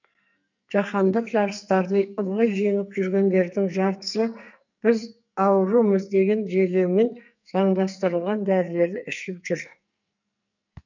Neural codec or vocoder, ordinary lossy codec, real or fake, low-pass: codec, 44.1 kHz, 2.6 kbps, SNAC; none; fake; 7.2 kHz